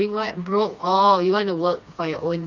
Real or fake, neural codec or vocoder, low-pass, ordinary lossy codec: fake; codec, 16 kHz, 2 kbps, FreqCodec, smaller model; 7.2 kHz; none